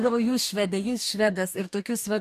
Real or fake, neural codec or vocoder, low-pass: fake; codec, 44.1 kHz, 2.6 kbps, DAC; 14.4 kHz